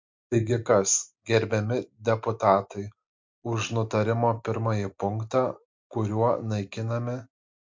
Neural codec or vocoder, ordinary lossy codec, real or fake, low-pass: none; MP3, 64 kbps; real; 7.2 kHz